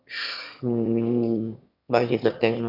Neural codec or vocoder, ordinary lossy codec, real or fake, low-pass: autoencoder, 22.05 kHz, a latent of 192 numbers a frame, VITS, trained on one speaker; none; fake; 5.4 kHz